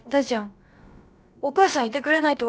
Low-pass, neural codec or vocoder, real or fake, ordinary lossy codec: none; codec, 16 kHz, about 1 kbps, DyCAST, with the encoder's durations; fake; none